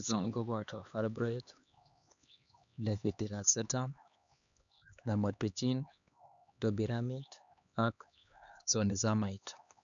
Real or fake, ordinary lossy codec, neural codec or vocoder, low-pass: fake; none; codec, 16 kHz, 2 kbps, X-Codec, HuBERT features, trained on LibriSpeech; 7.2 kHz